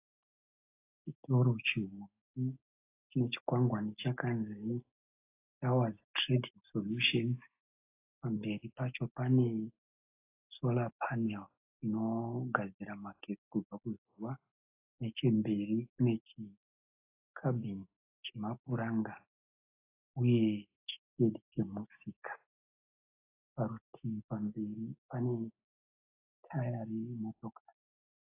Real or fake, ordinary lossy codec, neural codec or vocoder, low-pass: real; AAC, 24 kbps; none; 3.6 kHz